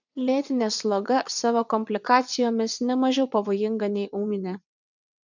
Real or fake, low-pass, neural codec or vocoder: fake; 7.2 kHz; vocoder, 22.05 kHz, 80 mel bands, WaveNeXt